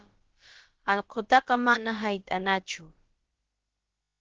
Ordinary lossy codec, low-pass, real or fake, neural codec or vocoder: Opus, 16 kbps; 7.2 kHz; fake; codec, 16 kHz, about 1 kbps, DyCAST, with the encoder's durations